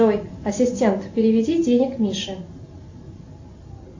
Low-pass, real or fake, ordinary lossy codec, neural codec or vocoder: 7.2 kHz; real; AAC, 48 kbps; none